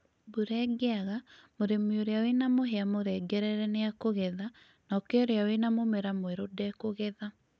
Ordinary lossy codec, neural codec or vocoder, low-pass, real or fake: none; none; none; real